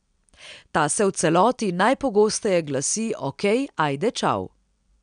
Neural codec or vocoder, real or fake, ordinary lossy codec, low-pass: none; real; none; 9.9 kHz